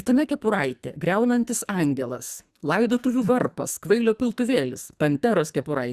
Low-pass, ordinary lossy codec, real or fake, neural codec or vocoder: 14.4 kHz; Opus, 64 kbps; fake; codec, 44.1 kHz, 2.6 kbps, SNAC